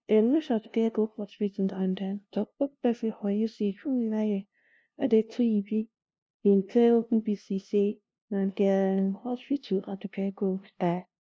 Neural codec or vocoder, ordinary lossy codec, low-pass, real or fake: codec, 16 kHz, 0.5 kbps, FunCodec, trained on LibriTTS, 25 frames a second; none; none; fake